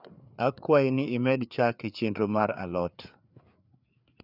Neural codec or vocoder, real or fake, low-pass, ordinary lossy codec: codec, 16 kHz, 4 kbps, FreqCodec, larger model; fake; 5.4 kHz; none